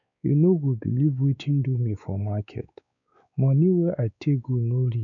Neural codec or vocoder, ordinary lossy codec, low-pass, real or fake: codec, 16 kHz, 4 kbps, X-Codec, WavLM features, trained on Multilingual LibriSpeech; none; 7.2 kHz; fake